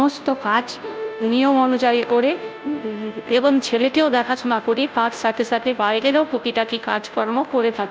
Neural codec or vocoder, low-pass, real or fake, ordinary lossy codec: codec, 16 kHz, 0.5 kbps, FunCodec, trained on Chinese and English, 25 frames a second; none; fake; none